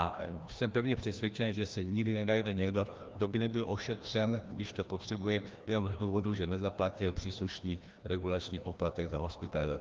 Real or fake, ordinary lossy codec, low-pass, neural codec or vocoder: fake; Opus, 32 kbps; 7.2 kHz; codec, 16 kHz, 1 kbps, FreqCodec, larger model